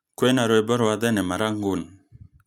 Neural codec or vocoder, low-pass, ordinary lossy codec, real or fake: none; 19.8 kHz; none; real